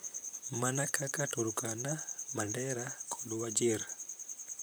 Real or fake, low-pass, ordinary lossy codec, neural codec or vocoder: fake; none; none; vocoder, 44.1 kHz, 128 mel bands, Pupu-Vocoder